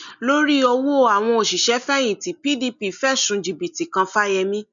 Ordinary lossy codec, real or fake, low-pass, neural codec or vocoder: none; real; 7.2 kHz; none